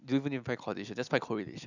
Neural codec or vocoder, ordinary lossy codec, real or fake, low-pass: none; none; real; 7.2 kHz